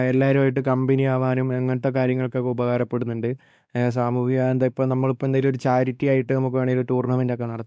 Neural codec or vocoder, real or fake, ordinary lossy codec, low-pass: codec, 16 kHz, 2 kbps, X-Codec, WavLM features, trained on Multilingual LibriSpeech; fake; none; none